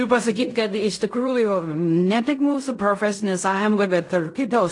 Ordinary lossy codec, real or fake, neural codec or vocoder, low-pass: AAC, 48 kbps; fake; codec, 16 kHz in and 24 kHz out, 0.4 kbps, LongCat-Audio-Codec, fine tuned four codebook decoder; 10.8 kHz